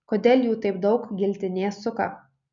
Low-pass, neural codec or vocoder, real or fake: 7.2 kHz; none; real